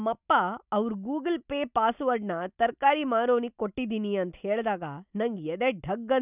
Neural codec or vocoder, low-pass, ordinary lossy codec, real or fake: none; 3.6 kHz; none; real